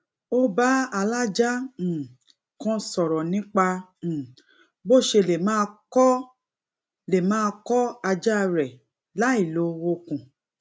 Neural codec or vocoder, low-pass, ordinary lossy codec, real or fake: none; none; none; real